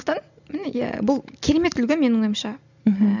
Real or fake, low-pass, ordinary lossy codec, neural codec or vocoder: real; 7.2 kHz; none; none